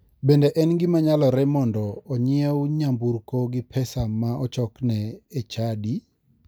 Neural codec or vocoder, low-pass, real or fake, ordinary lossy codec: none; none; real; none